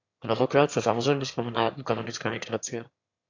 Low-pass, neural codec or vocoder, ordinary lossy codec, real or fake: 7.2 kHz; autoencoder, 22.05 kHz, a latent of 192 numbers a frame, VITS, trained on one speaker; AAC, 48 kbps; fake